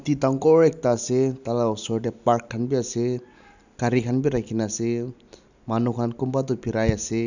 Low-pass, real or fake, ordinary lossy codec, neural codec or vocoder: 7.2 kHz; real; none; none